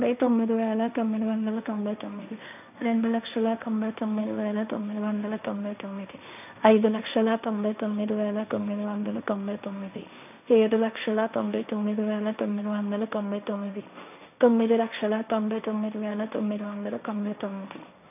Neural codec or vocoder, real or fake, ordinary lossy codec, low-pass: codec, 16 kHz, 1.1 kbps, Voila-Tokenizer; fake; none; 3.6 kHz